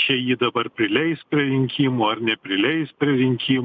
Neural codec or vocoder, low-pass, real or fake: none; 7.2 kHz; real